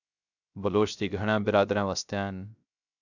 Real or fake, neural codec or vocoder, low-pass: fake; codec, 16 kHz, 0.3 kbps, FocalCodec; 7.2 kHz